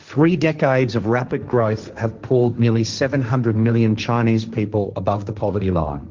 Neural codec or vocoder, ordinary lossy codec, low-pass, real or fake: codec, 16 kHz, 1.1 kbps, Voila-Tokenizer; Opus, 32 kbps; 7.2 kHz; fake